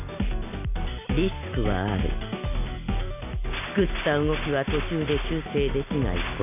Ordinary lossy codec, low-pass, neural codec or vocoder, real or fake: none; 3.6 kHz; none; real